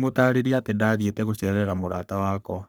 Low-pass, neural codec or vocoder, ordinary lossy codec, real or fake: none; codec, 44.1 kHz, 3.4 kbps, Pupu-Codec; none; fake